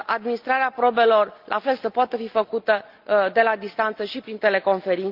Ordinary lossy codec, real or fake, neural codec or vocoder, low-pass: Opus, 24 kbps; real; none; 5.4 kHz